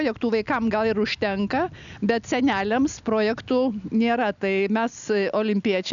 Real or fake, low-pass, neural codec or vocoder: real; 7.2 kHz; none